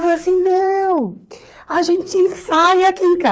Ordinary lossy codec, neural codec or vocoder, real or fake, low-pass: none; codec, 16 kHz, 4 kbps, FreqCodec, larger model; fake; none